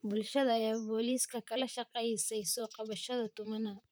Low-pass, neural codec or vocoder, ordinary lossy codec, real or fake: none; vocoder, 44.1 kHz, 128 mel bands, Pupu-Vocoder; none; fake